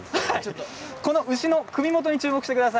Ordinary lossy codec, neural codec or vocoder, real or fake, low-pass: none; none; real; none